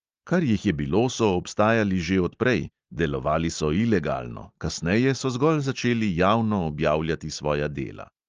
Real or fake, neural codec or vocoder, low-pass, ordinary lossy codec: real; none; 7.2 kHz; Opus, 24 kbps